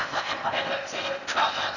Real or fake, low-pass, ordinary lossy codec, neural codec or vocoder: fake; 7.2 kHz; none; codec, 16 kHz in and 24 kHz out, 0.6 kbps, FocalCodec, streaming, 4096 codes